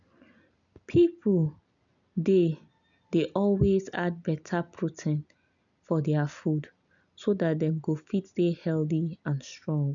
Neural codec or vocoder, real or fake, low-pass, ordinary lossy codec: none; real; 7.2 kHz; none